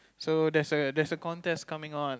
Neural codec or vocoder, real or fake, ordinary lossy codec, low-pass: none; real; none; none